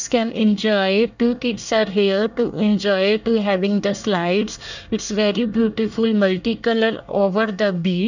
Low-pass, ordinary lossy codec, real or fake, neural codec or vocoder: 7.2 kHz; none; fake; codec, 24 kHz, 1 kbps, SNAC